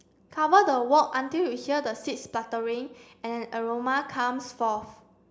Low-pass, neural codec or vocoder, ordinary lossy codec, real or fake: none; none; none; real